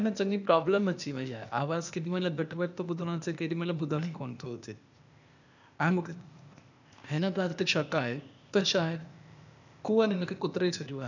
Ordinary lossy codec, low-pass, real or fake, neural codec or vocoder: none; 7.2 kHz; fake; codec, 16 kHz, 0.8 kbps, ZipCodec